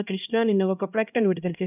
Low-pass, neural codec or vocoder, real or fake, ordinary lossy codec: 3.6 kHz; codec, 16 kHz, 1 kbps, X-Codec, HuBERT features, trained on LibriSpeech; fake; none